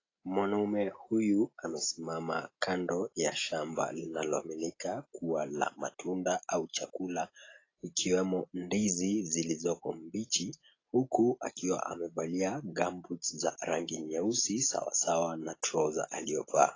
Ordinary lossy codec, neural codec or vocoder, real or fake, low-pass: AAC, 32 kbps; none; real; 7.2 kHz